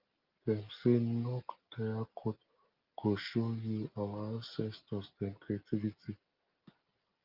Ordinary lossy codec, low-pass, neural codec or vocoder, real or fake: Opus, 32 kbps; 5.4 kHz; none; real